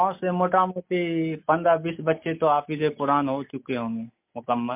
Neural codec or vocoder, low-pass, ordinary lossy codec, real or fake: none; 3.6 kHz; MP3, 32 kbps; real